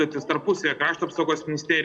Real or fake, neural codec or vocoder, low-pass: real; none; 9.9 kHz